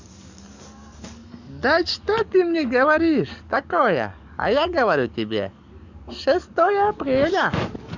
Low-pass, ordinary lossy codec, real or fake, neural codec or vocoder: 7.2 kHz; none; fake; codec, 44.1 kHz, 7.8 kbps, DAC